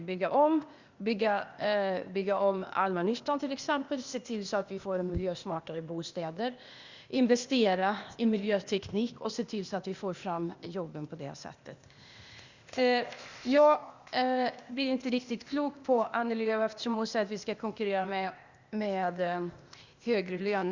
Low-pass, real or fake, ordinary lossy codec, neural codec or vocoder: 7.2 kHz; fake; Opus, 64 kbps; codec, 16 kHz, 0.8 kbps, ZipCodec